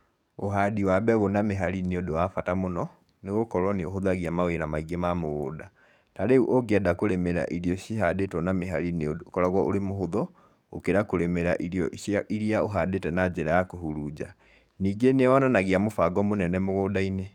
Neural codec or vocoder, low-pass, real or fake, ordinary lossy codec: codec, 44.1 kHz, 7.8 kbps, DAC; 19.8 kHz; fake; none